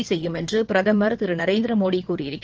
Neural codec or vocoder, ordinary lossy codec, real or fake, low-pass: vocoder, 22.05 kHz, 80 mel bands, WaveNeXt; Opus, 16 kbps; fake; 7.2 kHz